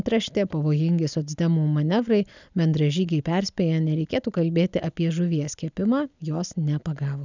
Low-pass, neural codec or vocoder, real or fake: 7.2 kHz; none; real